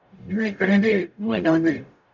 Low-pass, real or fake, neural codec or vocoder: 7.2 kHz; fake; codec, 44.1 kHz, 0.9 kbps, DAC